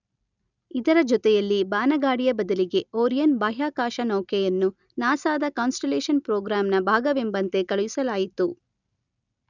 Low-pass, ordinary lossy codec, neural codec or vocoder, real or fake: 7.2 kHz; none; none; real